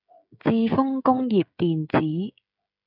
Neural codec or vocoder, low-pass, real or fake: codec, 16 kHz, 16 kbps, FreqCodec, smaller model; 5.4 kHz; fake